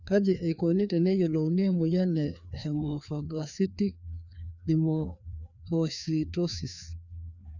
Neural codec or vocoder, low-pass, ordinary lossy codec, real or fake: codec, 16 kHz, 2 kbps, FreqCodec, larger model; 7.2 kHz; none; fake